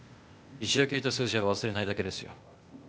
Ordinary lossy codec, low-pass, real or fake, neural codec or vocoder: none; none; fake; codec, 16 kHz, 0.8 kbps, ZipCodec